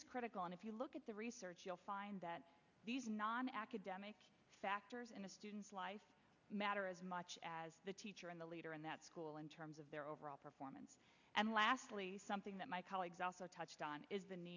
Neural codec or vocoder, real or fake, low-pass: none; real; 7.2 kHz